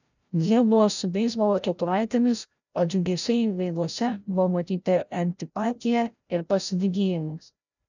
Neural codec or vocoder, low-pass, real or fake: codec, 16 kHz, 0.5 kbps, FreqCodec, larger model; 7.2 kHz; fake